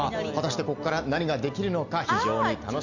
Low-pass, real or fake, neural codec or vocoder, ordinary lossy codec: 7.2 kHz; real; none; none